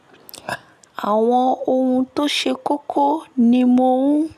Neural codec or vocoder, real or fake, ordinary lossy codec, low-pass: none; real; none; 14.4 kHz